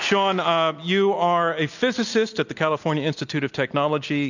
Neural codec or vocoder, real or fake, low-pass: none; real; 7.2 kHz